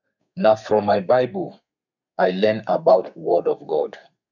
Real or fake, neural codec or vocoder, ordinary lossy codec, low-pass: fake; codec, 32 kHz, 1.9 kbps, SNAC; none; 7.2 kHz